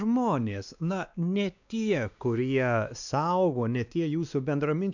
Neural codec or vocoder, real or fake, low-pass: codec, 16 kHz, 2 kbps, X-Codec, WavLM features, trained on Multilingual LibriSpeech; fake; 7.2 kHz